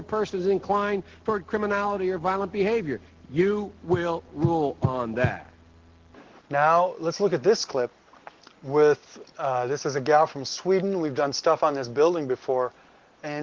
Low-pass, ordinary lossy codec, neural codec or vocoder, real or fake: 7.2 kHz; Opus, 16 kbps; none; real